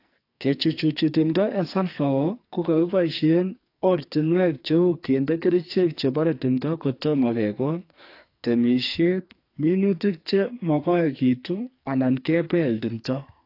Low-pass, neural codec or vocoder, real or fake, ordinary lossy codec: 5.4 kHz; codec, 44.1 kHz, 2.6 kbps, SNAC; fake; AAC, 32 kbps